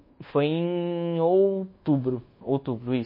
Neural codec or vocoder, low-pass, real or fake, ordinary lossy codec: codec, 24 kHz, 1.2 kbps, DualCodec; 5.4 kHz; fake; MP3, 24 kbps